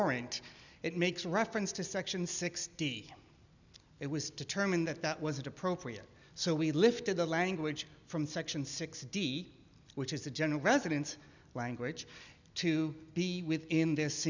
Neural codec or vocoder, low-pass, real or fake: none; 7.2 kHz; real